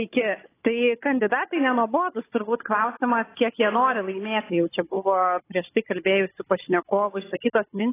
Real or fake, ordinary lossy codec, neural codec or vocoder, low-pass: fake; AAC, 16 kbps; codec, 16 kHz, 16 kbps, FunCodec, trained on Chinese and English, 50 frames a second; 3.6 kHz